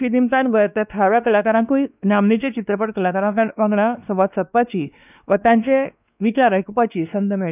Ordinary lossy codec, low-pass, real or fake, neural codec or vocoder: none; 3.6 kHz; fake; codec, 16 kHz, 2 kbps, X-Codec, WavLM features, trained on Multilingual LibriSpeech